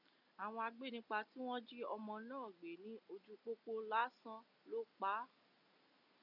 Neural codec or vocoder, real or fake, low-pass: none; real; 5.4 kHz